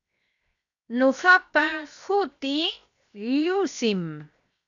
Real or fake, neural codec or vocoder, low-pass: fake; codec, 16 kHz, 0.7 kbps, FocalCodec; 7.2 kHz